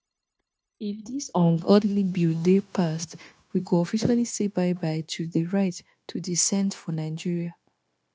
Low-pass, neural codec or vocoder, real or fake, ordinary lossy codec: none; codec, 16 kHz, 0.9 kbps, LongCat-Audio-Codec; fake; none